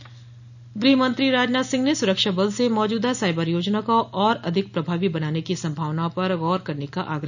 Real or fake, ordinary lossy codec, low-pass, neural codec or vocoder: real; none; 7.2 kHz; none